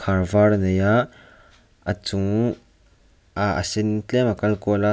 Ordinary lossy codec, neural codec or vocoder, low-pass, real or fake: none; none; none; real